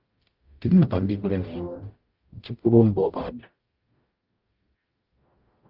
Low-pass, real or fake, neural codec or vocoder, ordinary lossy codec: 5.4 kHz; fake; codec, 44.1 kHz, 0.9 kbps, DAC; Opus, 32 kbps